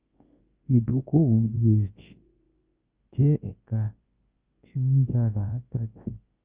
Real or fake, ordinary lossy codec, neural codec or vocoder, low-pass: fake; Opus, 24 kbps; codec, 24 kHz, 0.9 kbps, WavTokenizer, large speech release; 3.6 kHz